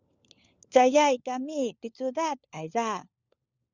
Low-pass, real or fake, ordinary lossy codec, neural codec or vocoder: 7.2 kHz; fake; Opus, 64 kbps; codec, 16 kHz, 4 kbps, FunCodec, trained on LibriTTS, 50 frames a second